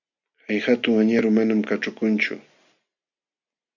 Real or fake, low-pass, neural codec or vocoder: real; 7.2 kHz; none